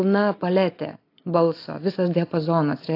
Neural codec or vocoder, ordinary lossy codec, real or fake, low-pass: none; AAC, 32 kbps; real; 5.4 kHz